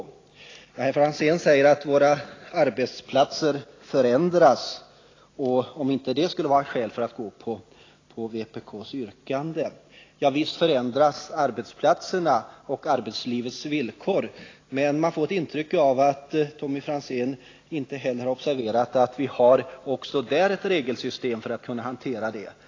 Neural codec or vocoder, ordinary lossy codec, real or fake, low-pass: none; AAC, 32 kbps; real; 7.2 kHz